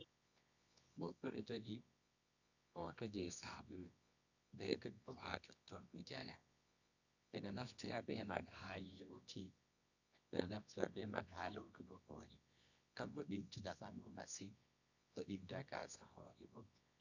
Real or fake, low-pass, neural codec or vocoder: fake; 7.2 kHz; codec, 24 kHz, 0.9 kbps, WavTokenizer, medium music audio release